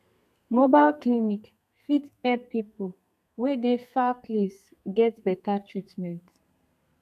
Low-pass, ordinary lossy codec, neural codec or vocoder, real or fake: 14.4 kHz; none; codec, 32 kHz, 1.9 kbps, SNAC; fake